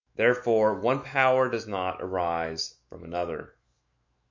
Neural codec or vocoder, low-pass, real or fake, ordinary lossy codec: autoencoder, 48 kHz, 128 numbers a frame, DAC-VAE, trained on Japanese speech; 7.2 kHz; fake; MP3, 48 kbps